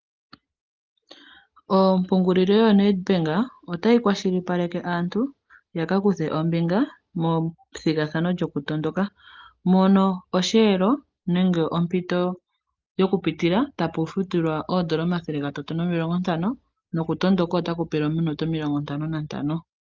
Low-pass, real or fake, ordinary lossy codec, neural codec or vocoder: 7.2 kHz; real; Opus, 24 kbps; none